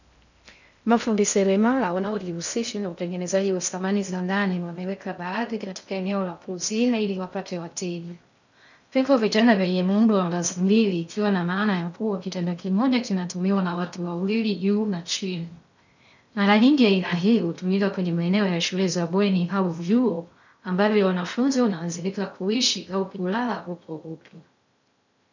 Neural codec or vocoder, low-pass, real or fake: codec, 16 kHz in and 24 kHz out, 0.6 kbps, FocalCodec, streaming, 2048 codes; 7.2 kHz; fake